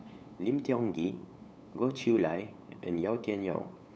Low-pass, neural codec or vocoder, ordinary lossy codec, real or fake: none; codec, 16 kHz, 8 kbps, FunCodec, trained on LibriTTS, 25 frames a second; none; fake